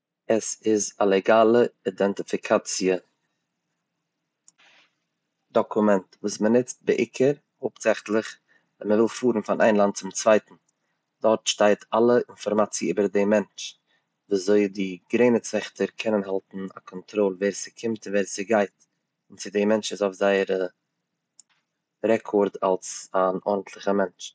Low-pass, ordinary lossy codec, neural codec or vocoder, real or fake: none; none; none; real